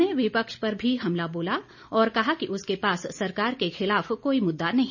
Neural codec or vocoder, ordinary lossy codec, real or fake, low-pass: none; none; real; 7.2 kHz